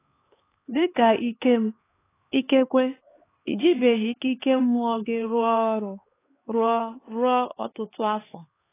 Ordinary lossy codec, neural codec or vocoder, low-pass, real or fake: AAC, 16 kbps; codec, 16 kHz, 4 kbps, X-Codec, HuBERT features, trained on LibriSpeech; 3.6 kHz; fake